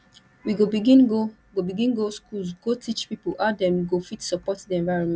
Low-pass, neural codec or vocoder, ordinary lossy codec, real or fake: none; none; none; real